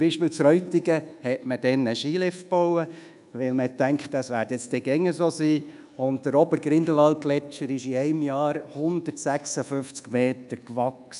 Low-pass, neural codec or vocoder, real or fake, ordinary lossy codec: 10.8 kHz; codec, 24 kHz, 1.2 kbps, DualCodec; fake; none